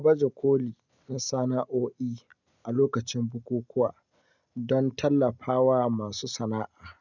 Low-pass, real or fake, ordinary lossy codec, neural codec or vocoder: 7.2 kHz; real; none; none